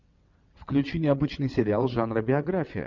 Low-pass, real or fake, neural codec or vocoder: 7.2 kHz; fake; vocoder, 22.05 kHz, 80 mel bands, WaveNeXt